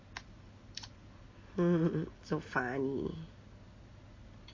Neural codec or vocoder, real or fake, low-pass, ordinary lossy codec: none; real; 7.2 kHz; MP3, 32 kbps